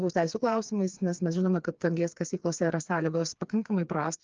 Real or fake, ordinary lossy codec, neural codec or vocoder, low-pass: fake; Opus, 24 kbps; codec, 16 kHz, 4 kbps, FreqCodec, smaller model; 7.2 kHz